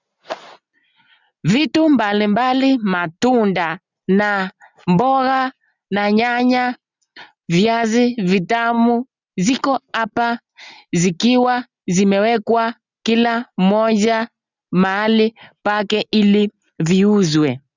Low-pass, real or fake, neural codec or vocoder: 7.2 kHz; real; none